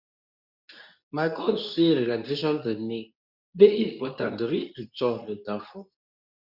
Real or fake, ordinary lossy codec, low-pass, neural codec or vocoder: fake; none; 5.4 kHz; codec, 24 kHz, 0.9 kbps, WavTokenizer, medium speech release version 2